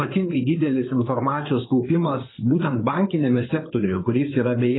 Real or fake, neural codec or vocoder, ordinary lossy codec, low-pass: fake; codec, 16 kHz in and 24 kHz out, 2.2 kbps, FireRedTTS-2 codec; AAC, 16 kbps; 7.2 kHz